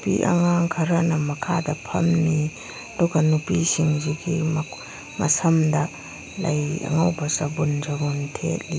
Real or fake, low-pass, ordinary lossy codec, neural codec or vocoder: real; none; none; none